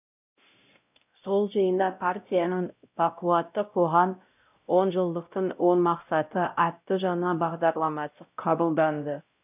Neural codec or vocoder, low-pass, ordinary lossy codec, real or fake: codec, 16 kHz, 0.5 kbps, X-Codec, WavLM features, trained on Multilingual LibriSpeech; 3.6 kHz; none; fake